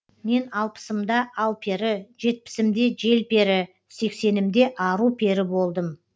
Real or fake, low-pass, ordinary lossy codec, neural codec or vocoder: real; none; none; none